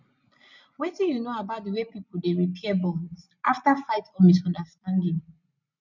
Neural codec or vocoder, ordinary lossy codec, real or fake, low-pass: none; none; real; 7.2 kHz